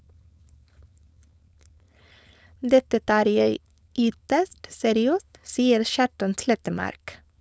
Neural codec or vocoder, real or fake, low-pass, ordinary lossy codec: codec, 16 kHz, 4.8 kbps, FACodec; fake; none; none